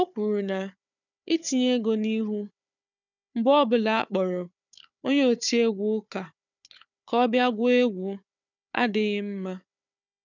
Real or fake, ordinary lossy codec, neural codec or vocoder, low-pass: fake; none; codec, 16 kHz, 4 kbps, FunCodec, trained on Chinese and English, 50 frames a second; 7.2 kHz